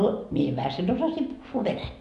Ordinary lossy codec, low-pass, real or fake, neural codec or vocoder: none; 10.8 kHz; real; none